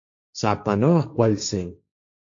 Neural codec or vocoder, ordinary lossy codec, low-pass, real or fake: codec, 16 kHz, 1.1 kbps, Voila-Tokenizer; AAC, 64 kbps; 7.2 kHz; fake